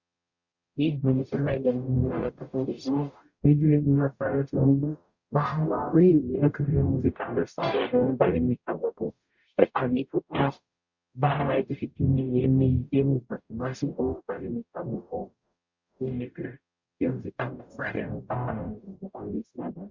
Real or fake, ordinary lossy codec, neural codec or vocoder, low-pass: fake; Opus, 64 kbps; codec, 44.1 kHz, 0.9 kbps, DAC; 7.2 kHz